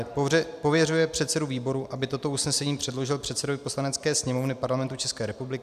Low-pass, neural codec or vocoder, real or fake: 14.4 kHz; none; real